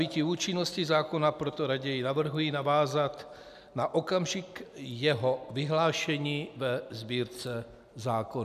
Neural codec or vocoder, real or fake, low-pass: none; real; 14.4 kHz